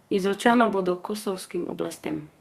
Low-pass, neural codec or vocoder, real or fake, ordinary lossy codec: 14.4 kHz; codec, 32 kHz, 1.9 kbps, SNAC; fake; Opus, 64 kbps